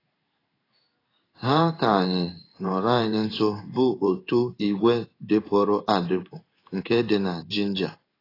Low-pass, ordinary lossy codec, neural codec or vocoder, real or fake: 5.4 kHz; AAC, 24 kbps; codec, 16 kHz in and 24 kHz out, 1 kbps, XY-Tokenizer; fake